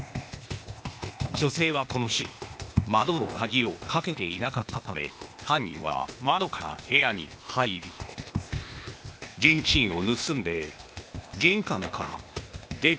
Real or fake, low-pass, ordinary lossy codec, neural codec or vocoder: fake; none; none; codec, 16 kHz, 0.8 kbps, ZipCodec